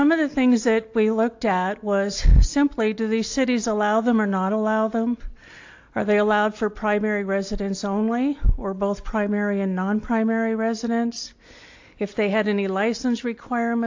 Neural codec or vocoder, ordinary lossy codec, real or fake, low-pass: none; AAC, 48 kbps; real; 7.2 kHz